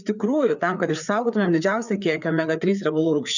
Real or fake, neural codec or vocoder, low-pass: fake; codec, 16 kHz, 4 kbps, FreqCodec, larger model; 7.2 kHz